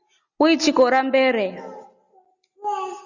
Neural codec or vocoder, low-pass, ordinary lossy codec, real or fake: none; 7.2 kHz; Opus, 64 kbps; real